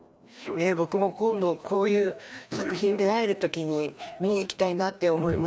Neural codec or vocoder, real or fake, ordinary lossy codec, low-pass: codec, 16 kHz, 1 kbps, FreqCodec, larger model; fake; none; none